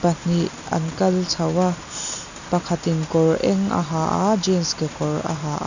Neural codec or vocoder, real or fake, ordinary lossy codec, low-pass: none; real; none; 7.2 kHz